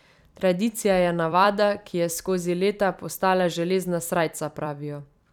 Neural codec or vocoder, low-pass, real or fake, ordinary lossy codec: vocoder, 44.1 kHz, 128 mel bands every 256 samples, BigVGAN v2; 19.8 kHz; fake; none